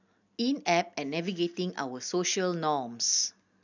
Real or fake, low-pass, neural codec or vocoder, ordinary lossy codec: real; 7.2 kHz; none; none